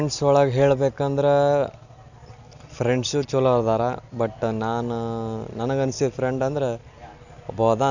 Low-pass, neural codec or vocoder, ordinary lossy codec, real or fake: 7.2 kHz; none; none; real